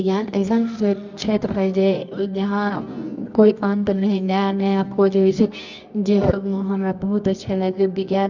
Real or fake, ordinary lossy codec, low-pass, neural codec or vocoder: fake; none; 7.2 kHz; codec, 24 kHz, 0.9 kbps, WavTokenizer, medium music audio release